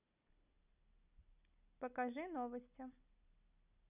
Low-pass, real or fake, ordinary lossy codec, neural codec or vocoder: 3.6 kHz; real; none; none